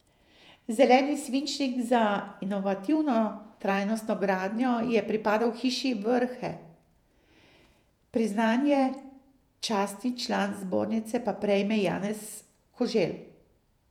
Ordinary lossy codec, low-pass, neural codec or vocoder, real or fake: none; 19.8 kHz; vocoder, 48 kHz, 128 mel bands, Vocos; fake